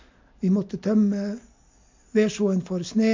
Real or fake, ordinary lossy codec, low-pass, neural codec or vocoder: real; MP3, 48 kbps; 7.2 kHz; none